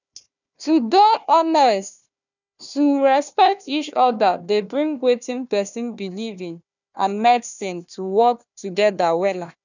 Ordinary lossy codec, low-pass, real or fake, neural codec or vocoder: none; 7.2 kHz; fake; codec, 16 kHz, 1 kbps, FunCodec, trained on Chinese and English, 50 frames a second